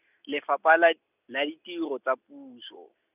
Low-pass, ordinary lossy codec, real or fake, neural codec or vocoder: 3.6 kHz; none; real; none